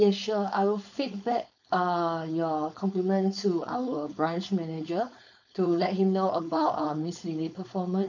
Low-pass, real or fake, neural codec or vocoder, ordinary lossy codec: 7.2 kHz; fake; codec, 16 kHz, 4.8 kbps, FACodec; none